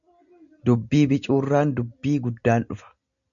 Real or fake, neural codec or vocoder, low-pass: real; none; 7.2 kHz